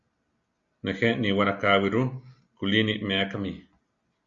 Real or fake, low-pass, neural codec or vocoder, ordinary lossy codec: real; 7.2 kHz; none; Opus, 64 kbps